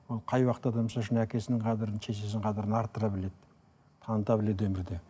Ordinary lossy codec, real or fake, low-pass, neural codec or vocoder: none; real; none; none